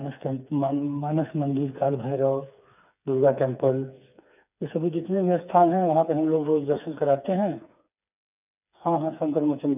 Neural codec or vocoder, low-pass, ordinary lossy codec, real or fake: codec, 16 kHz, 4 kbps, FreqCodec, smaller model; 3.6 kHz; none; fake